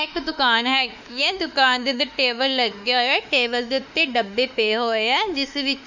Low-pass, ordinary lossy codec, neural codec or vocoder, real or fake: 7.2 kHz; none; autoencoder, 48 kHz, 32 numbers a frame, DAC-VAE, trained on Japanese speech; fake